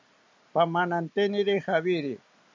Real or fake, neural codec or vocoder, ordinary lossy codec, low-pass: real; none; MP3, 48 kbps; 7.2 kHz